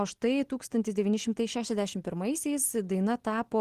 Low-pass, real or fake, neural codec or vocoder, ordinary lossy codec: 14.4 kHz; real; none; Opus, 16 kbps